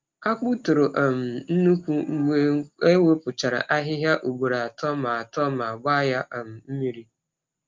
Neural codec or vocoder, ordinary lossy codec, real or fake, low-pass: none; Opus, 24 kbps; real; 7.2 kHz